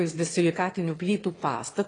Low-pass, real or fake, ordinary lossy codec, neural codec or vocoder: 9.9 kHz; fake; AAC, 32 kbps; autoencoder, 22.05 kHz, a latent of 192 numbers a frame, VITS, trained on one speaker